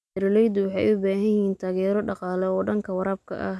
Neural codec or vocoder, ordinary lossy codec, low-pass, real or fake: none; none; none; real